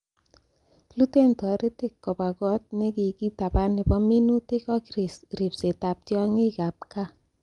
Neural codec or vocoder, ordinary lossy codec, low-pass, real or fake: none; Opus, 32 kbps; 10.8 kHz; real